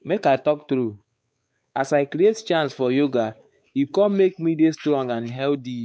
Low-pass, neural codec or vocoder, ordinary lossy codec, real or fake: none; codec, 16 kHz, 4 kbps, X-Codec, WavLM features, trained on Multilingual LibriSpeech; none; fake